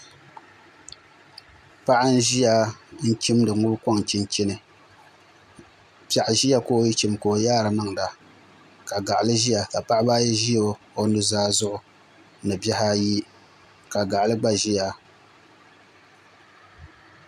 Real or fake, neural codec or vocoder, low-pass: real; none; 14.4 kHz